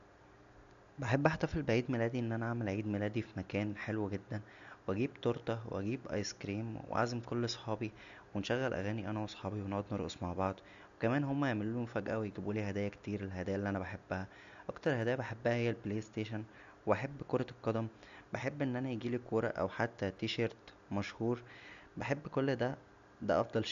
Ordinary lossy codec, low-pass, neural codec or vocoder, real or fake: AAC, 96 kbps; 7.2 kHz; none; real